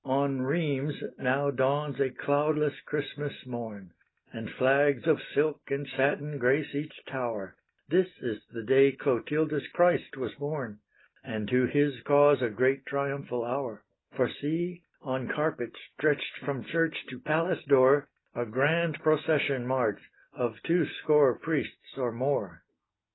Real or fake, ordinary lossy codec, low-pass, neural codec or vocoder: real; AAC, 16 kbps; 7.2 kHz; none